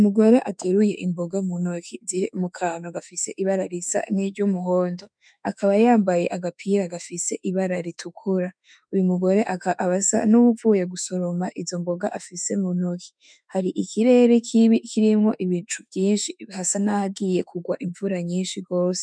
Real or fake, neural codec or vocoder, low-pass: fake; autoencoder, 48 kHz, 32 numbers a frame, DAC-VAE, trained on Japanese speech; 9.9 kHz